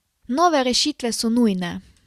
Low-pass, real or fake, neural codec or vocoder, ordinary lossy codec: 14.4 kHz; real; none; Opus, 64 kbps